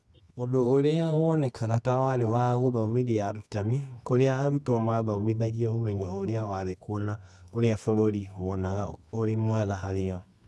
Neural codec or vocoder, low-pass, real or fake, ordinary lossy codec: codec, 24 kHz, 0.9 kbps, WavTokenizer, medium music audio release; none; fake; none